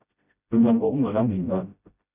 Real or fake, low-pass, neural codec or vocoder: fake; 3.6 kHz; codec, 16 kHz, 0.5 kbps, FreqCodec, smaller model